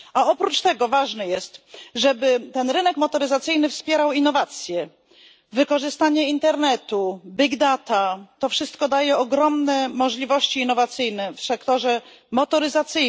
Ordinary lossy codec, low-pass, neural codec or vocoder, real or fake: none; none; none; real